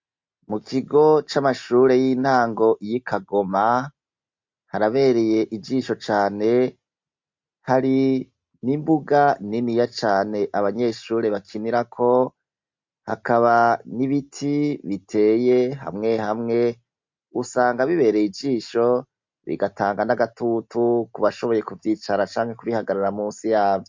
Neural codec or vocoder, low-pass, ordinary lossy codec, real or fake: none; 7.2 kHz; MP3, 48 kbps; real